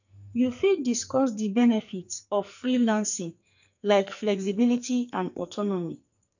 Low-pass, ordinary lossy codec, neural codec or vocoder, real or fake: 7.2 kHz; none; codec, 44.1 kHz, 2.6 kbps, SNAC; fake